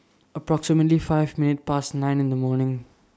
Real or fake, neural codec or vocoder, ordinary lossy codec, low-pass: real; none; none; none